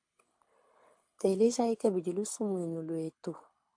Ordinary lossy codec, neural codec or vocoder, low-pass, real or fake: AAC, 64 kbps; codec, 24 kHz, 6 kbps, HILCodec; 9.9 kHz; fake